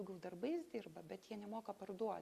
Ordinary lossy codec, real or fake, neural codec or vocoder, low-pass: Opus, 64 kbps; real; none; 14.4 kHz